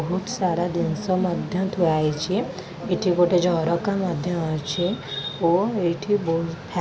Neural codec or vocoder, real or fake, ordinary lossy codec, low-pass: none; real; none; none